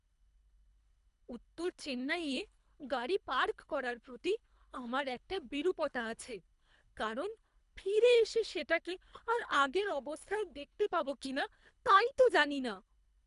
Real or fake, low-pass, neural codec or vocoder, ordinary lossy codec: fake; 10.8 kHz; codec, 24 kHz, 3 kbps, HILCodec; none